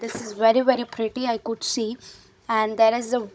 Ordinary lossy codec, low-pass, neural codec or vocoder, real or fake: none; none; codec, 16 kHz, 16 kbps, FunCodec, trained on Chinese and English, 50 frames a second; fake